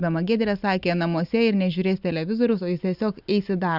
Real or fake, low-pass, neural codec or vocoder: real; 5.4 kHz; none